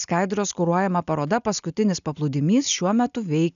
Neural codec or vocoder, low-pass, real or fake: none; 7.2 kHz; real